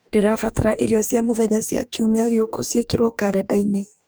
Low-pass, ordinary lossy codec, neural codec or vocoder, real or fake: none; none; codec, 44.1 kHz, 2.6 kbps, DAC; fake